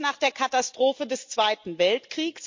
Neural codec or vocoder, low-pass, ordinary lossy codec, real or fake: none; 7.2 kHz; MP3, 48 kbps; real